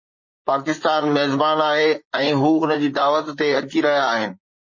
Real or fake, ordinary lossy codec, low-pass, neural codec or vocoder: fake; MP3, 32 kbps; 7.2 kHz; vocoder, 44.1 kHz, 128 mel bands, Pupu-Vocoder